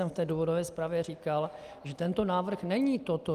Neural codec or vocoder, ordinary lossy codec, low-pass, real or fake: none; Opus, 32 kbps; 14.4 kHz; real